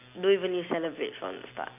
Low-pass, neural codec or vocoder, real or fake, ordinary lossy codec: 3.6 kHz; none; real; AAC, 32 kbps